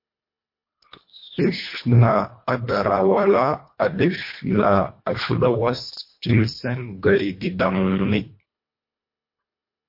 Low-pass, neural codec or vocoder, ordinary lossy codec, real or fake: 5.4 kHz; codec, 24 kHz, 1.5 kbps, HILCodec; MP3, 32 kbps; fake